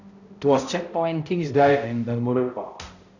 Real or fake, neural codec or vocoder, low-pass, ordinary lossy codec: fake; codec, 16 kHz, 0.5 kbps, X-Codec, HuBERT features, trained on balanced general audio; 7.2 kHz; none